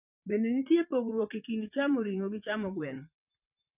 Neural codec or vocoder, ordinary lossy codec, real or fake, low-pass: vocoder, 44.1 kHz, 128 mel bands, Pupu-Vocoder; none; fake; 3.6 kHz